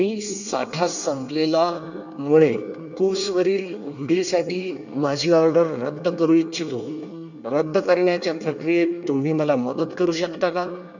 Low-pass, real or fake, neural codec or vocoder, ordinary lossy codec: 7.2 kHz; fake; codec, 24 kHz, 1 kbps, SNAC; none